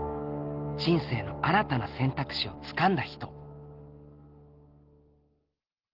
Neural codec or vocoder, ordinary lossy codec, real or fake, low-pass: none; Opus, 16 kbps; real; 5.4 kHz